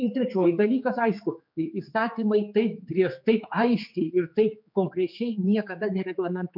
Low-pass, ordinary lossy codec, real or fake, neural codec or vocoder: 5.4 kHz; MP3, 48 kbps; fake; codec, 16 kHz, 4 kbps, X-Codec, HuBERT features, trained on general audio